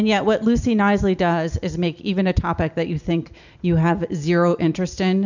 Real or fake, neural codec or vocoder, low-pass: real; none; 7.2 kHz